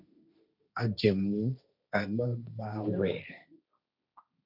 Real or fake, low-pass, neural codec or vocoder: fake; 5.4 kHz; codec, 16 kHz, 1.1 kbps, Voila-Tokenizer